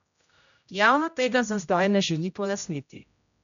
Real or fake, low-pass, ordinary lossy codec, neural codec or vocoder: fake; 7.2 kHz; none; codec, 16 kHz, 0.5 kbps, X-Codec, HuBERT features, trained on general audio